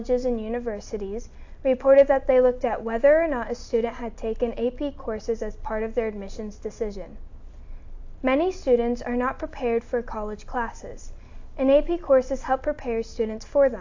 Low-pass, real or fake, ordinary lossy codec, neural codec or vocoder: 7.2 kHz; real; AAC, 48 kbps; none